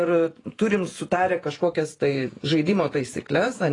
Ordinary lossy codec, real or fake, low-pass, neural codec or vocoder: AAC, 32 kbps; fake; 10.8 kHz; vocoder, 48 kHz, 128 mel bands, Vocos